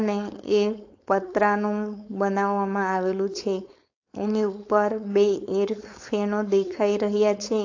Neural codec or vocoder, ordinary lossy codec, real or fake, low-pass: codec, 16 kHz, 4.8 kbps, FACodec; AAC, 48 kbps; fake; 7.2 kHz